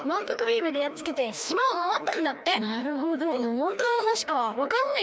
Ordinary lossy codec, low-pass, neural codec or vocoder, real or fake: none; none; codec, 16 kHz, 1 kbps, FreqCodec, larger model; fake